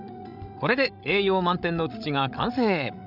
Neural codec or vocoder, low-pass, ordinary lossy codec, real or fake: codec, 16 kHz, 16 kbps, FreqCodec, larger model; 5.4 kHz; none; fake